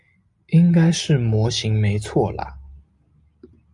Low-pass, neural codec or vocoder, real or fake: 10.8 kHz; none; real